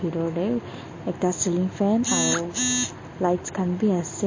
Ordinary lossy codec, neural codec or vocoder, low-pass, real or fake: MP3, 32 kbps; none; 7.2 kHz; real